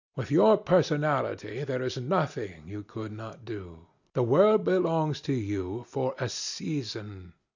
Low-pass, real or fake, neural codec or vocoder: 7.2 kHz; real; none